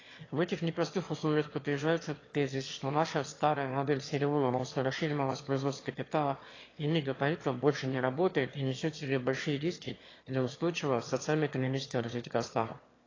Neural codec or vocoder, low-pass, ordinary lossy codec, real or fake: autoencoder, 22.05 kHz, a latent of 192 numbers a frame, VITS, trained on one speaker; 7.2 kHz; AAC, 32 kbps; fake